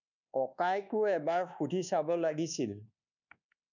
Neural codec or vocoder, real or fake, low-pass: codec, 24 kHz, 1.2 kbps, DualCodec; fake; 7.2 kHz